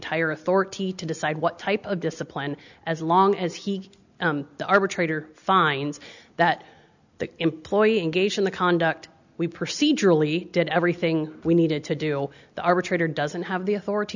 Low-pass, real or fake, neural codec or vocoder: 7.2 kHz; real; none